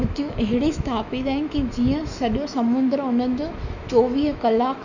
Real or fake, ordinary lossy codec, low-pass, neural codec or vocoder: fake; none; 7.2 kHz; autoencoder, 48 kHz, 128 numbers a frame, DAC-VAE, trained on Japanese speech